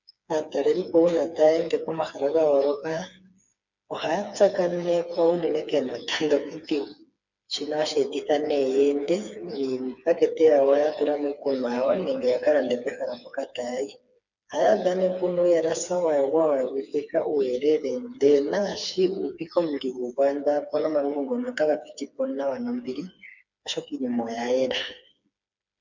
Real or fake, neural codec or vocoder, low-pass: fake; codec, 16 kHz, 4 kbps, FreqCodec, smaller model; 7.2 kHz